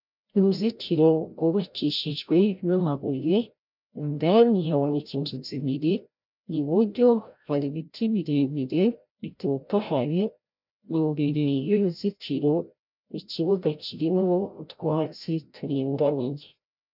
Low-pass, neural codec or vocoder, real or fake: 5.4 kHz; codec, 16 kHz, 0.5 kbps, FreqCodec, larger model; fake